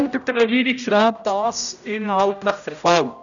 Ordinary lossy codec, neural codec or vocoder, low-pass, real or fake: none; codec, 16 kHz, 0.5 kbps, X-Codec, HuBERT features, trained on general audio; 7.2 kHz; fake